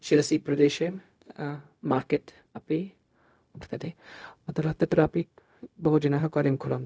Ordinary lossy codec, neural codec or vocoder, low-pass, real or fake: none; codec, 16 kHz, 0.4 kbps, LongCat-Audio-Codec; none; fake